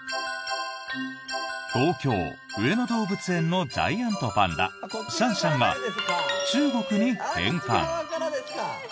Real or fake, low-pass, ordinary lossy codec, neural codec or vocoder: real; none; none; none